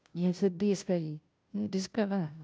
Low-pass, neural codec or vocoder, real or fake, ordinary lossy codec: none; codec, 16 kHz, 0.5 kbps, FunCodec, trained on Chinese and English, 25 frames a second; fake; none